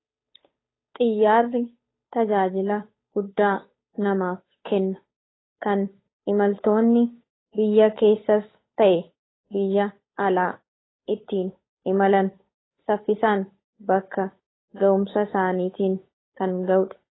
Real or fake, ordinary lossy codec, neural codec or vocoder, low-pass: fake; AAC, 16 kbps; codec, 16 kHz, 8 kbps, FunCodec, trained on Chinese and English, 25 frames a second; 7.2 kHz